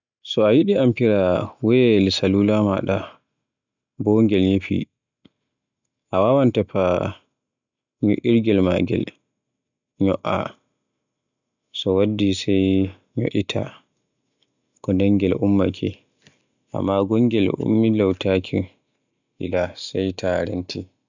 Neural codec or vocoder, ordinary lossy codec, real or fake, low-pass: none; none; real; 7.2 kHz